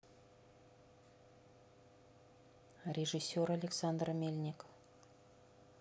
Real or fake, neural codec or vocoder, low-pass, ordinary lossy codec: real; none; none; none